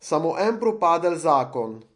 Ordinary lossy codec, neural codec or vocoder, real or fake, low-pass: MP3, 48 kbps; none; real; 10.8 kHz